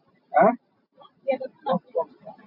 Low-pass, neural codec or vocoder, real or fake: 5.4 kHz; none; real